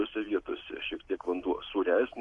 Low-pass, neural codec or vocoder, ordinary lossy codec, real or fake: 10.8 kHz; none; Opus, 64 kbps; real